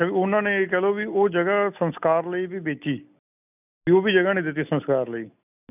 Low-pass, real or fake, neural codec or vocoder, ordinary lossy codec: 3.6 kHz; real; none; none